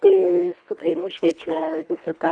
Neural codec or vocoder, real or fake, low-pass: codec, 24 kHz, 1.5 kbps, HILCodec; fake; 9.9 kHz